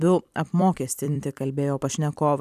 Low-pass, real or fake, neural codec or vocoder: 14.4 kHz; fake; vocoder, 44.1 kHz, 128 mel bands every 256 samples, BigVGAN v2